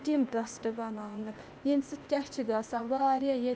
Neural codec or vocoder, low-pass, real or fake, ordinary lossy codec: codec, 16 kHz, 0.8 kbps, ZipCodec; none; fake; none